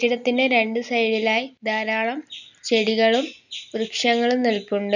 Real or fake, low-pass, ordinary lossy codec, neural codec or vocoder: real; 7.2 kHz; none; none